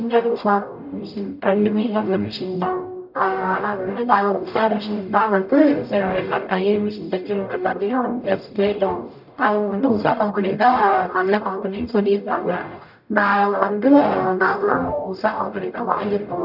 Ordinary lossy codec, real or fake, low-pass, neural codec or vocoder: none; fake; 5.4 kHz; codec, 44.1 kHz, 0.9 kbps, DAC